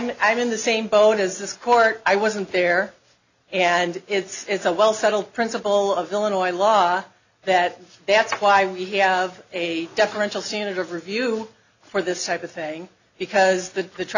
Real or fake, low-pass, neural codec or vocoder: real; 7.2 kHz; none